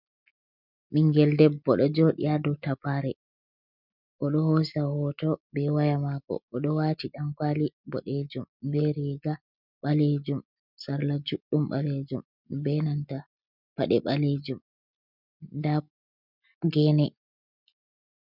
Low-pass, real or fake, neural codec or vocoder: 5.4 kHz; real; none